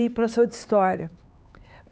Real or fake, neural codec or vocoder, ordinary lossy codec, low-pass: fake; codec, 16 kHz, 4 kbps, X-Codec, HuBERT features, trained on LibriSpeech; none; none